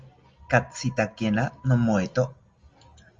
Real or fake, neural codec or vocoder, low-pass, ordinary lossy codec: real; none; 7.2 kHz; Opus, 32 kbps